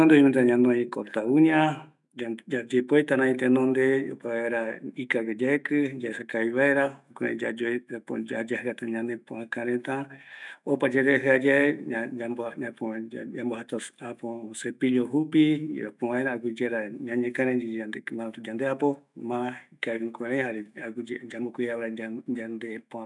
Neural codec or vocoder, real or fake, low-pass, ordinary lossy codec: autoencoder, 48 kHz, 128 numbers a frame, DAC-VAE, trained on Japanese speech; fake; 10.8 kHz; none